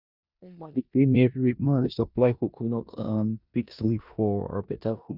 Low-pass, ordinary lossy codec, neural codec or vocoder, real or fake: 5.4 kHz; none; codec, 16 kHz in and 24 kHz out, 0.9 kbps, LongCat-Audio-Codec, four codebook decoder; fake